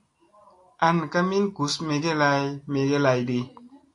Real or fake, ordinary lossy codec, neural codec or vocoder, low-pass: real; AAC, 48 kbps; none; 10.8 kHz